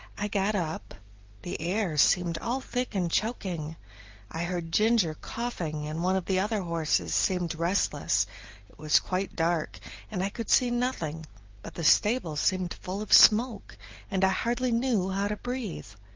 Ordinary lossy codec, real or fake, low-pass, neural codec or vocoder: Opus, 32 kbps; real; 7.2 kHz; none